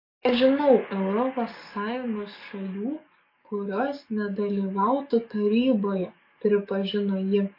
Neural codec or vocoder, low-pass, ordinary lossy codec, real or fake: codec, 44.1 kHz, 7.8 kbps, DAC; 5.4 kHz; MP3, 32 kbps; fake